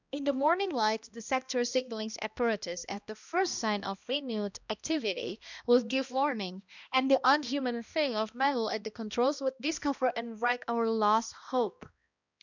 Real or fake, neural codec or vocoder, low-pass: fake; codec, 16 kHz, 1 kbps, X-Codec, HuBERT features, trained on balanced general audio; 7.2 kHz